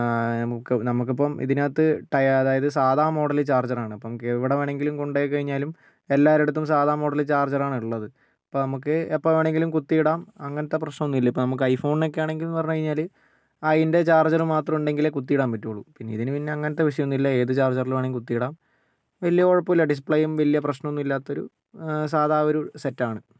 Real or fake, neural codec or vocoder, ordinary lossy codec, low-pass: real; none; none; none